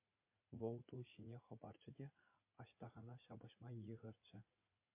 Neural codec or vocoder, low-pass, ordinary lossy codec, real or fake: vocoder, 44.1 kHz, 80 mel bands, Vocos; 3.6 kHz; MP3, 24 kbps; fake